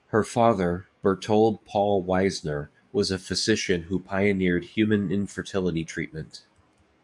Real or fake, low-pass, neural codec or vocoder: fake; 10.8 kHz; codec, 44.1 kHz, 7.8 kbps, DAC